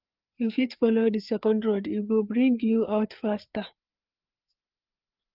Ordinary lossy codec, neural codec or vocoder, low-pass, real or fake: Opus, 24 kbps; codec, 16 kHz, 4 kbps, FreqCodec, larger model; 5.4 kHz; fake